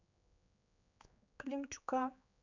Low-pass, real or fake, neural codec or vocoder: 7.2 kHz; fake; codec, 16 kHz, 4 kbps, X-Codec, HuBERT features, trained on general audio